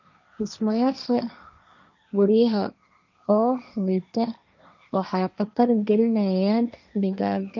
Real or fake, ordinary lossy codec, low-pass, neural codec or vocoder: fake; none; 7.2 kHz; codec, 16 kHz, 1.1 kbps, Voila-Tokenizer